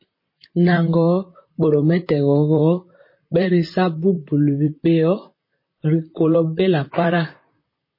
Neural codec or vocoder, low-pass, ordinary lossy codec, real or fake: vocoder, 44.1 kHz, 128 mel bands, Pupu-Vocoder; 5.4 kHz; MP3, 24 kbps; fake